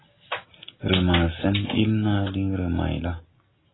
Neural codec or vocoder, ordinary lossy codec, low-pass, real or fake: none; AAC, 16 kbps; 7.2 kHz; real